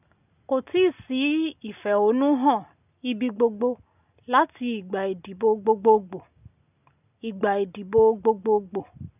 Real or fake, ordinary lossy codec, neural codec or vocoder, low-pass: real; none; none; 3.6 kHz